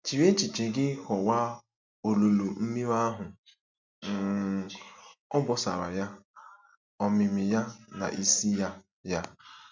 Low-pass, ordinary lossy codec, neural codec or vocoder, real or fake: 7.2 kHz; MP3, 64 kbps; none; real